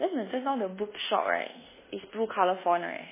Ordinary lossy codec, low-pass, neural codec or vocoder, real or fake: MP3, 16 kbps; 3.6 kHz; codec, 24 kHz, 1.2 kbps, DualCodec; fake